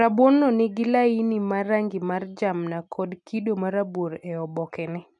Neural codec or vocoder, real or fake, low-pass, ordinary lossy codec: none; real; 10.8 kHz; none